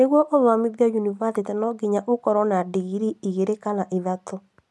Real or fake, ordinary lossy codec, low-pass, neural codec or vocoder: real; none; none; none